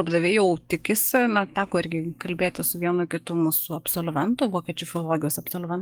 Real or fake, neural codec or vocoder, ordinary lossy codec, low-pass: fake; codec, 44.1 kHz, 7.8 kbps, DAC; Opus, 32 kbps; 19.8 kHz